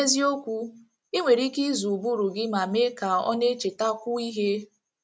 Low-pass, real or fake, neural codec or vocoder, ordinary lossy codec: none; real; none; none